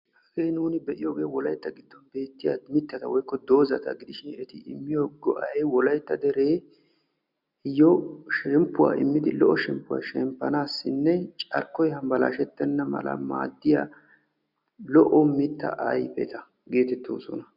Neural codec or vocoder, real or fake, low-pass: none; real; 5.4 kHz